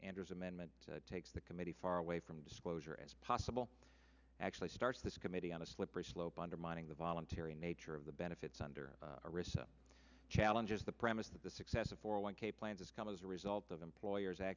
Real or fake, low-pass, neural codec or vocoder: real; 7.2 kHz; none